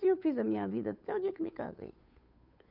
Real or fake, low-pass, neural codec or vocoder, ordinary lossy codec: fake; 5.4 kHz; codec, 16 kHz, 8 kbps, FunCodec, trained on Chinese and English, 25 frames a second; none